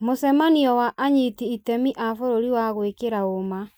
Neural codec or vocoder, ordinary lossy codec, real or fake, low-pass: none; none; real; none